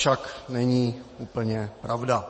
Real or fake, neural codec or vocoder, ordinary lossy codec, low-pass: fake; vocoder, 48 kHz, 128 mel bands, Vocos; MP3, 32 kbps; 10.8 kHz